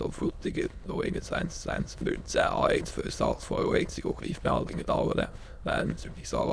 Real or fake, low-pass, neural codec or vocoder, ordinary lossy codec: fake; none; autoencoder, 22.05 kHz, a latent of 192 numbers a frame, VITS, trained on many speakers; none